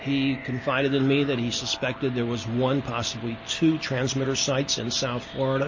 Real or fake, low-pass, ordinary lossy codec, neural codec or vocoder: real; 7.2 kHz; MP3, 32 kbps; none